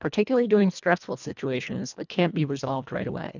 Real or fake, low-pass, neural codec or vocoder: fake; 7.2 kHz; codec, 24 kHz, 1.5 kbps, HILCodec